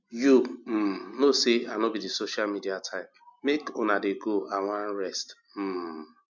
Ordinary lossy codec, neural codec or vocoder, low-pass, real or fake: none; none; 7.2 kHz; real